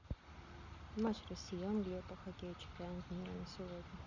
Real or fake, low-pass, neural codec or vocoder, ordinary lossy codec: real; 7.2 kHz; none; none